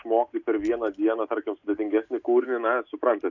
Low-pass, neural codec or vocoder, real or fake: 7.2 kHz; none; real